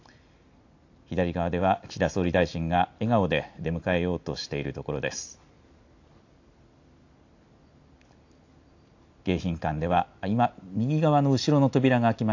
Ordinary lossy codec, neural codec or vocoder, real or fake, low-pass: AAC, 48 kbps; none; real; 7.2 kHz